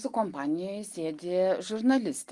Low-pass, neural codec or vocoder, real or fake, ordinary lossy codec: 10.8 kHz; none; real; Opus, 24 kbps